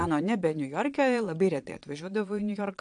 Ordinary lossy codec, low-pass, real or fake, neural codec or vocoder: AAC, 64 kbps; 9.9 kHz; fake; vocoder, 22.05 kHz, 80 mel bands, Vocos